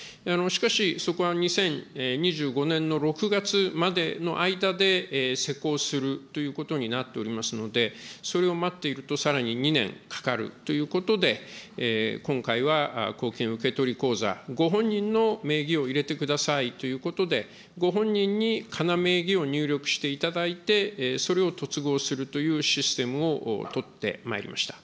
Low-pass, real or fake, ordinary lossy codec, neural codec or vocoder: none; real; none; none